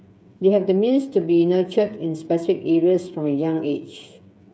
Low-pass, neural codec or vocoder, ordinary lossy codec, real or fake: none; codec, 16 kHz, 8 kbps, FreqCodec, smaller model; none; fake